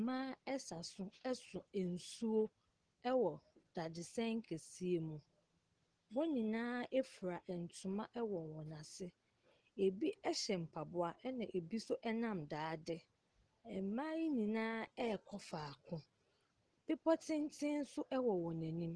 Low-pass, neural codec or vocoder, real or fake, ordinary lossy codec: 9.9 kHz; none; real; Opus, 16 kbps